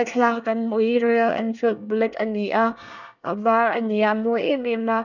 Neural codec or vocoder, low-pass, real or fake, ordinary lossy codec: codec, 24 kHz, 1 kbps, SNAC; 7.2 kHz; fake; none